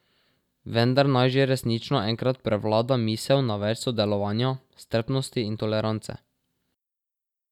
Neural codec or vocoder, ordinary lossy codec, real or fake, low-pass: none; none; real; 19.8 kHz